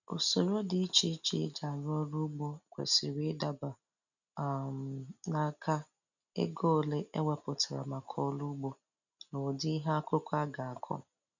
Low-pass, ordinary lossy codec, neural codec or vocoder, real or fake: 7.2 kHz; none; none; real